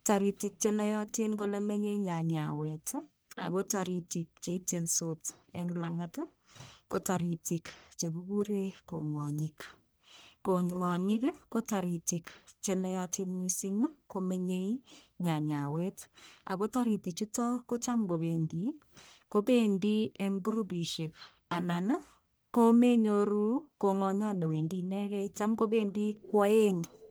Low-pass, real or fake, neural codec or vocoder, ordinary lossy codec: none; fake; codec, 44.1 kHz, 1.7 kbps, Pupu-Codec; none